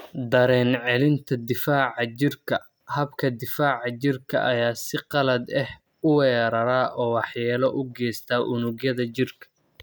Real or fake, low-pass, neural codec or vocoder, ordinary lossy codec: real; none; none; none